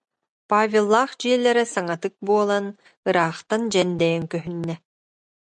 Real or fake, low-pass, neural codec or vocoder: real; 9.9 kHz; none